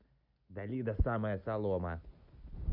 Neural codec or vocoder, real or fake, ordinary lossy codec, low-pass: none; real; none; 5.4 kHz